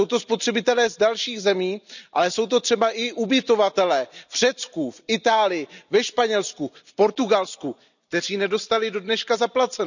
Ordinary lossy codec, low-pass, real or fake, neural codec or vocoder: none; 7.2 kHz; real; none